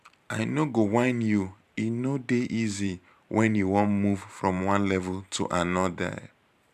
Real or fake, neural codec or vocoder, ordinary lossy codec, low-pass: fake; vocoder, 44.1 kHz, 128 mel bands every 512 samples, BigVGAN v2; none; 14.4 kHz